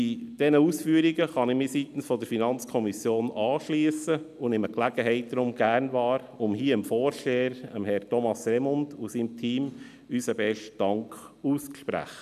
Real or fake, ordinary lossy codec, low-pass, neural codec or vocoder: real; none; 14.4 kHz; none